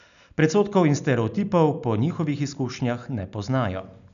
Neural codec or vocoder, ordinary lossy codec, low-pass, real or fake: none; none; 7.2 kHz; real